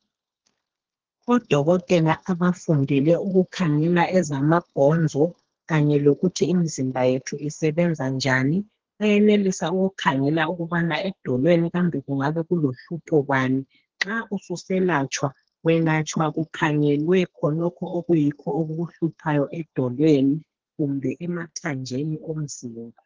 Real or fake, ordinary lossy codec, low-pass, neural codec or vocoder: fake; Opus, 16 kbps; 7.2 kHz; codec, 32 kHz, 1.9 kbps, SNAC